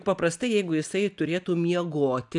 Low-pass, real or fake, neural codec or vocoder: 10.8 kHz; real; none